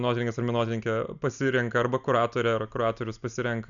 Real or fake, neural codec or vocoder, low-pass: real; none; 7.2 kHz